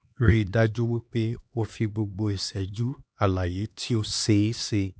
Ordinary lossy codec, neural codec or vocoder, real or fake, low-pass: none; codec, 16 kHz, 2 kbps, X-Codec, HuBERT features, trained on LibriSpeech; fake; none